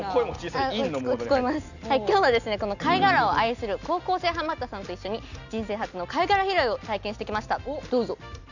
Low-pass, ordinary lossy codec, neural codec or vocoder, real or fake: 7.2 kHz; none; none; real